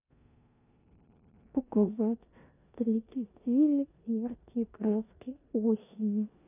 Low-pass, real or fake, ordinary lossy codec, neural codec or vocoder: 3.6 kHz; fake; none; codec, 16 kHz in and 24 kHz out, 0.9 kbps, LongCat-Audio-Codec, four codebook decoder